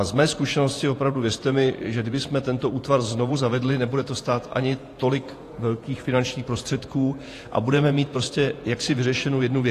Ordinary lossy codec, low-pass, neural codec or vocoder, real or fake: AAC, 48 kbps; 14.4 kHz; vocoder, 48 kHz, 128 mel bands, Vocos; fake